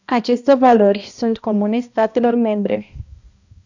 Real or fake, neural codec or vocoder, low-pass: fake; codec, 16 kHz, 1 kbps, X-Codec, HuBERT features, trained on balanced general audio; 7.2 kHz